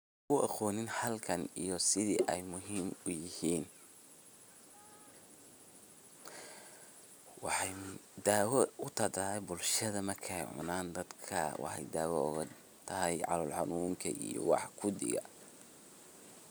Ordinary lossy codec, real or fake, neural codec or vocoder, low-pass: none; real; none; none